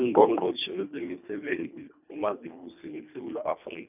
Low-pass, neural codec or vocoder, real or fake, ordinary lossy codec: 3.6 kHz; codec, 24 kHz, 1.5 kbps, HILCodec; fake; none